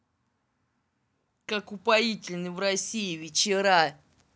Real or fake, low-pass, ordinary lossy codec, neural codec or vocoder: real; none; none; none